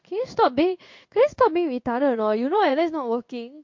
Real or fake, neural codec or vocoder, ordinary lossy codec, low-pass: fake; codec, 16 kHz in and 24 kHz out, 1 kbps, XY-Tokenizer; MP3, 48 kbps; 7.2 kHz